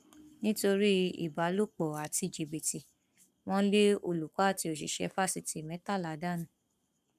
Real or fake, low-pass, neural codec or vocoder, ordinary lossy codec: fake; 14.4 kHz; codec, 44.1 kHz, 7.8 kbps, Pupu-Codec; none